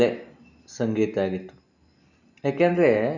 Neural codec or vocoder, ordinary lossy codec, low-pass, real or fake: none; none; 7.2 kHz; real